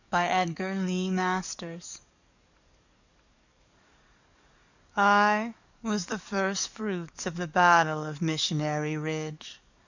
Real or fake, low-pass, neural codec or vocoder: fake; 7.2 kHz; codec, 44.1 kHz, 7.8 kbps, DAC